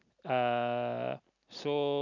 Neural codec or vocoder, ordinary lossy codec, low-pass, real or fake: none; none; 7.2 kHz; real